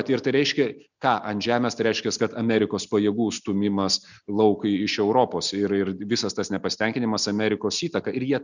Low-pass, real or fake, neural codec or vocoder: 7.2 kHz; real; none